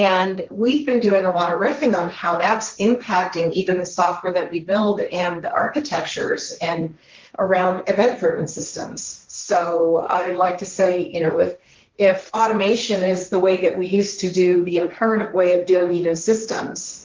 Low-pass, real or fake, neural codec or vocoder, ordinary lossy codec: 7.2 kHz; fake; codec, 16 kHz, 1.1 kbps, Voila-Tokenizer; Opus, 32 kbps